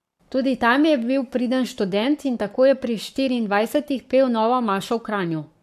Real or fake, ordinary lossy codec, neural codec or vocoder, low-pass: fake; AAC, 96 kbps; codec, 44.1 kHz, 7.8 kbps, Pupu-Codec; 14.4 kHz